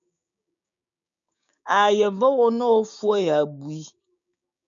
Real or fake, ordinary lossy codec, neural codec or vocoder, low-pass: fake; MP3, 96 kbps; codec, 16 kHz, 6 kbps, DAC; 7.2 kHz